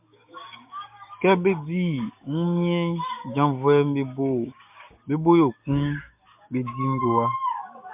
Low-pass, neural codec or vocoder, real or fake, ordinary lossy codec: 3.6 kHz; codec, 16 kHz, 6 kbps, DAC; fake; MP3, 32 kbps